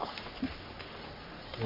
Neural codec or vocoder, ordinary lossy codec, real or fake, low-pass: codec, 44.1 kHz, 3.4 kbps, Pupu-Codec; MP3, 32 kbps; fake; 5.4 kHz